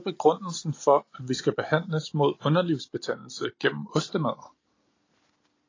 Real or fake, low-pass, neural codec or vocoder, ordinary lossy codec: real; 7.2 kHz; none; AAC, 32 kbps